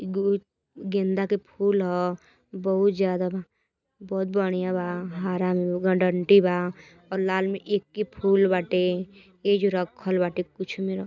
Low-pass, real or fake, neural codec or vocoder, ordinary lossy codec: 7.2 kHz; real; none; none